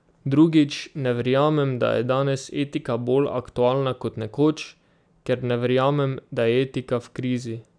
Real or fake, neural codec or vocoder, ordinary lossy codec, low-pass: real; none; none; 9.9 kHz